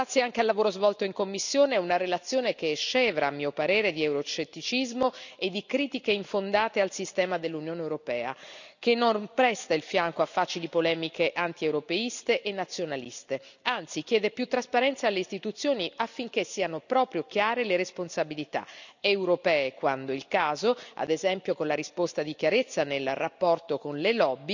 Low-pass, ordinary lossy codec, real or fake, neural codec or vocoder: 7.2 kHz; none; real; none